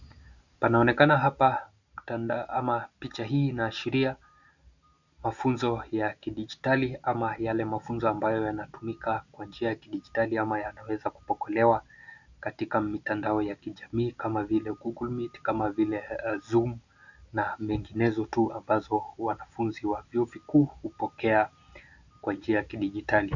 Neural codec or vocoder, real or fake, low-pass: none; real; 7.2 kHz